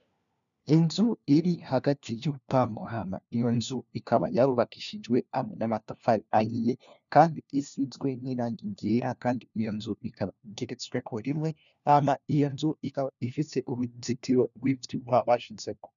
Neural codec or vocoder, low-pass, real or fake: codec, 16 kHz, 1 kbps, FunCodec, trained on LibriTTS, 50 frames a second; 7.2 kHz; fake